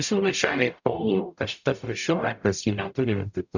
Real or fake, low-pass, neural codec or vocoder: fake; 7.2 kHz; codec, 44.1 kHz, 0.9 kbps, DAC